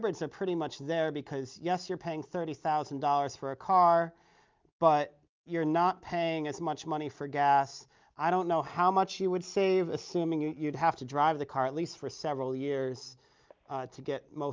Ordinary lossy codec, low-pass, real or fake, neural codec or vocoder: Opus, 24 kbps; 7.2 kHz; real; none